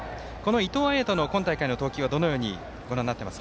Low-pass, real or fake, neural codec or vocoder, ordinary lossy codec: none; real; none; none